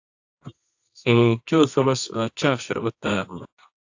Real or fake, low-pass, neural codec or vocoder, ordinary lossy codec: fake; 7.2 kHz; codec, 24 kHz, 0.9 kbps, WavTokenizer, medium music audio release; AAC, 48 kbps